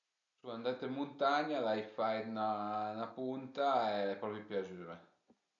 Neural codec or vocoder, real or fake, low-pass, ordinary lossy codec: none; real; 7.2 kHz; none